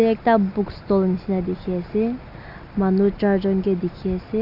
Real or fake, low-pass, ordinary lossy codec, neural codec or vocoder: real; 5.4 kHz; AAC, 48 kbps; none